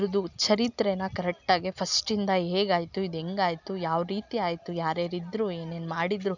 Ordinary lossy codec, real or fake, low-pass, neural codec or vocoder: none; real; 7.2 kHz; none